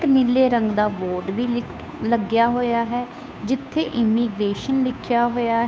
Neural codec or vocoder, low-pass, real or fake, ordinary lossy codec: codec, 16 kHz, 2 kbps, FunCodec, trained on Chinese and English, 25 frames a second; none; fake; none